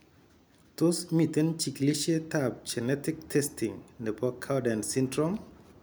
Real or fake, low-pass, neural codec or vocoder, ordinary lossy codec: real; none; none; none